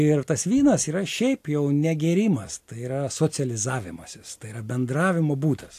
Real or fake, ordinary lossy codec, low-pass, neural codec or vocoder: real; AAC, 64 kbps; 14.4 kHz; none